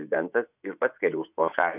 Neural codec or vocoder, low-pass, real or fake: vocoder, 24 kHz, 100 mel bands, Vocos; 3.6 kHz; fake